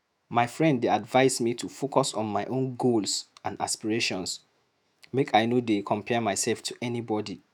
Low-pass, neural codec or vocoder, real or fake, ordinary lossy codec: 19.8 kHz; autoencoder, 48 kHz, 128 numbers a frame, DAC-VAE, trained on Japanese speech; fake; none